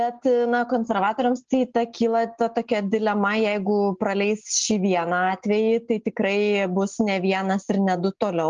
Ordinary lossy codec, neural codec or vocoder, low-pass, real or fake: Opus, 32 kbps; none; 7.2 kHz; real